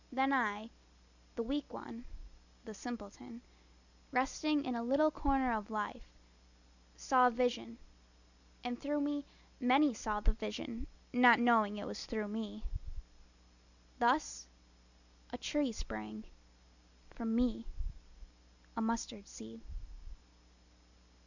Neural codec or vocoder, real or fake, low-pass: none; real; 7.2 kHz